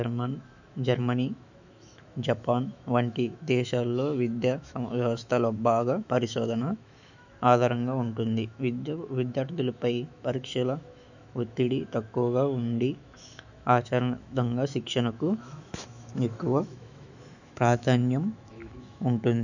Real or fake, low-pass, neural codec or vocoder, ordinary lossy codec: fake; 7.2 kHz; autoencoder, 48 kHz, 128 numbers a frame, DAC-VAE, trained on Japanese speech; none